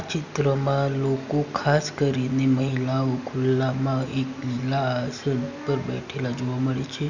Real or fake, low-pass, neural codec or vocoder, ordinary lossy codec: real; 7.2 kHz; none; none